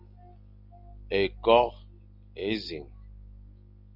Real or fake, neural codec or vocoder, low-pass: real; none; 5.4 kHz